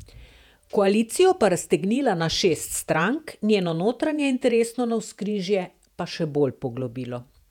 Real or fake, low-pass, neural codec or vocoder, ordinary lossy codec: fake; 19.8 kHz; vocoder, 48 kHz, 128 mel bands, Vocos; none